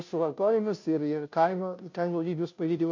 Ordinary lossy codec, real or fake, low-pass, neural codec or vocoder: MP3, 48 kbps; fake; 7.2 kHz; codec, 16 kHz, 0.5 kbps, FunCodec, trained on Chinese and English, 25 frames a second